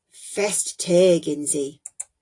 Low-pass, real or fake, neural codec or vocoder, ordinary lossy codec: 10.8 kHz; real; none; AAC, 48 kbps